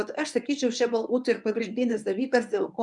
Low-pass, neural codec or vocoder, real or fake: 10.8 kHz; codec, 24 kHz, 0.9 kbps, WavTokenizer, medium speech release version 1; fake